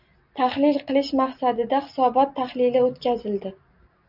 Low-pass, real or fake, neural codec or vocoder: 5.4 kHz; real; none